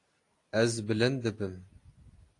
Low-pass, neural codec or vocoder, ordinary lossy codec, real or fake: 10.8 kHz; none; AAC, 48 kbps; real